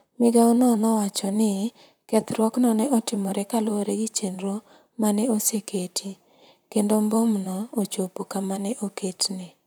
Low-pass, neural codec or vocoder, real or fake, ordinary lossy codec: none; vocoder, 44.1 kHz, 128 mel bands, Pupu-Vocoder; fake; none